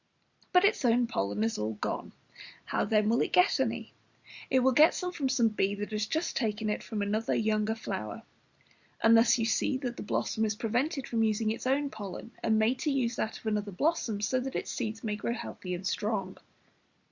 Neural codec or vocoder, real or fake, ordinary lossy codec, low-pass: none; real; Opus, 64 kbps; 7.2 kHz